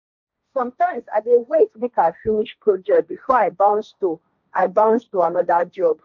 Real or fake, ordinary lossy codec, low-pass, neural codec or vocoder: fake; none; 7.2 kHz; codec, 16 kHz, 1.1 kbps, Voila-Tokenizer